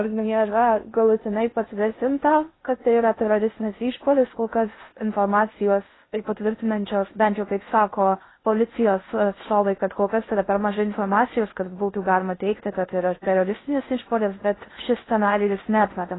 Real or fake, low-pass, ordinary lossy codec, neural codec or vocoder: fake; 7.2 kHz; AAC, 16 kbps; codec, 16 kHz in and 24 kHz out, 0.6 kbps, FocalCodec, streaming, 2048 codes